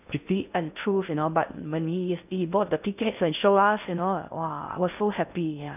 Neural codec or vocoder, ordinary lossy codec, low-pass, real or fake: codec, 16 kHz in and 24 kHz out, 0.6 kbps, FocalCodec, streaming, 2048 codes; none; 3.6 kHz; fake